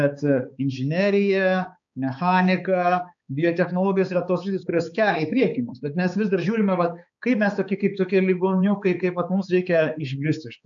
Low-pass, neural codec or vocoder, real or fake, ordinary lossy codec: 7.2 kHz; codec, 16 kHz, 4 kbps, X-Codec, HuBERT features, trained on balanced general audio; fake; AAC, 64 kbps